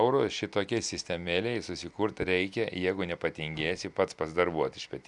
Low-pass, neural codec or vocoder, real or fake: 10.8 kHz; none; real